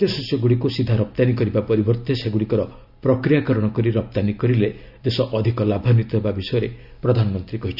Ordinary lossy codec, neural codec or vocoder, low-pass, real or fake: none; none; 5.4 kHz; real